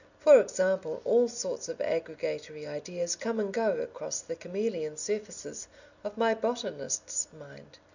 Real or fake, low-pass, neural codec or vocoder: real; 7.2 kHz; none